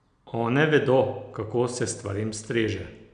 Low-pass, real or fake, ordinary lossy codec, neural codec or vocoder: 9.9 kHz; real; none; none